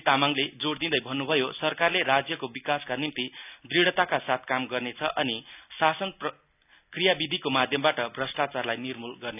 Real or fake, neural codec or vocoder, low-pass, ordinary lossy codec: real; none; 3.6 kHz; none